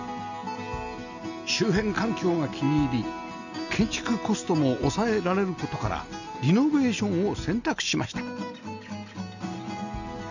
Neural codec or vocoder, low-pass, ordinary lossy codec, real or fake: none; 7.2 kHz; none; real